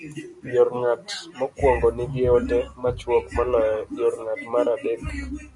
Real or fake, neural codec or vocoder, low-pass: real; none; 10.8 kHz